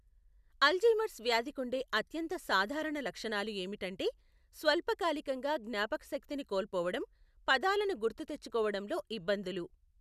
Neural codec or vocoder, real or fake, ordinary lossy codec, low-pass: none; real; none; 14.4 kHz